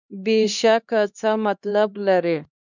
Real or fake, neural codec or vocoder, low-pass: fake; codec, 16 kHz, 2 kbps, X-Codec, HuBERT features, trained on LibriSpeech; 7.2 kHz